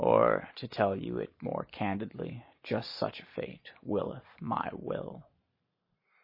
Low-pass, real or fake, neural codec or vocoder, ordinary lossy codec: 5.4 kHz; real; none; MP3, 24 kbps